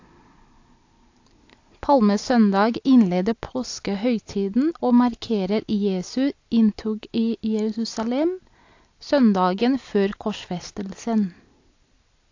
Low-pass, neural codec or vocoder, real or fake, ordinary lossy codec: 7.2 kHz; none; real; AAC, 48 kbps